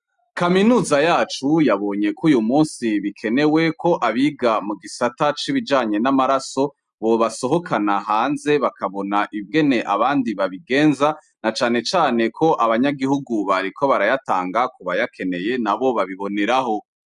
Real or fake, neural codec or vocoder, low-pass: real; none; 10.8 kHz